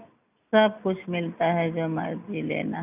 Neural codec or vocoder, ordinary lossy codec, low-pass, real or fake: none; none; 3.6 kHz; real